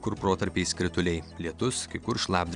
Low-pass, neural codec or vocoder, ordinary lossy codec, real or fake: 9.9 kHz; none; Opus, 64 kbps; real